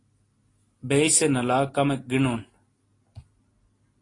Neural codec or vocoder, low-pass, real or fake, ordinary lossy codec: none; 10.8 kHz; real; AAC, 32 kbps